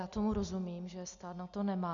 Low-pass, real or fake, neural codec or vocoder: 7.2 kHz; real; none